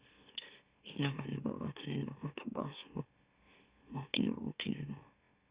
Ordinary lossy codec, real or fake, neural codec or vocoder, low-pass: Opus, 64 kbps; fake; autoencoder, 44.1 kHz, a latent of 192 numbers a frame, MeloTTS; 3.6 kHz